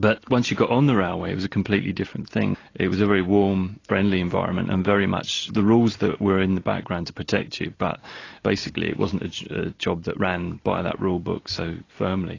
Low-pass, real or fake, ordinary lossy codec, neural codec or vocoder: 7.2 kHz; real; AAC, 32 kbps; none